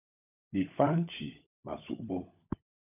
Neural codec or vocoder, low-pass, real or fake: codec, 16 kHz, 16 kbps, FunCodec, trained on LibriTTS, 50 frames a second; 3.6 kHz; fake